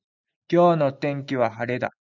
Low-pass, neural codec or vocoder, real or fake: 7.2 kHz; none; real